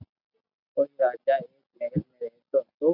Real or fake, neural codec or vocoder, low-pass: real; none; 5.4 kHz